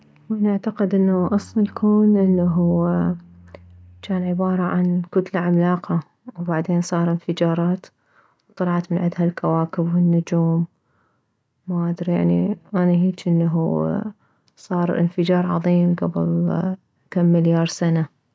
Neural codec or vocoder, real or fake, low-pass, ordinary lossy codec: none; real; none; none